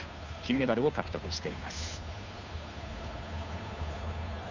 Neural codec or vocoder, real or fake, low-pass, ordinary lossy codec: codec, 16 kHz, 2 kbps, FunCodec, trained on Chinese and English, 25 frames a second; fake; 7.2 kHz; none